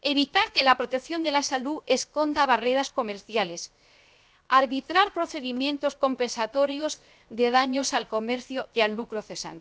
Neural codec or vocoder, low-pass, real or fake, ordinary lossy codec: codec, 16 kHz, 0.7 kbps, FocalCodec; none; fake; none